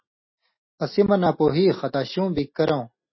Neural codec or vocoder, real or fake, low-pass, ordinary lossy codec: none; real; 7.2 kHz; MP3, 24 kbps